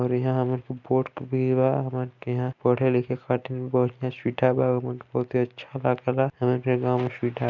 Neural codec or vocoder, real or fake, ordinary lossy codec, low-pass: none; real; none; 7.2 kHz